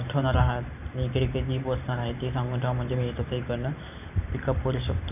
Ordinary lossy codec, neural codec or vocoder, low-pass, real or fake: none; vocoder, 44.1 kHz, 128 mel bands every 512 samples, BigVGAN v2; 3.6 kHz; fake